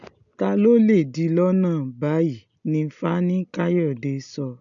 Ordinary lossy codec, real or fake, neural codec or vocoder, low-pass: none; real; none; 7.2 kHz